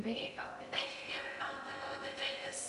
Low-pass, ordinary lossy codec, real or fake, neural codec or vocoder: 10.8 kHz; AAC, 96 kbps; fake; codec, 16 kHz in and 24 kHz out, 0.6 kbps, FocalCodec, streaming, 4096 codes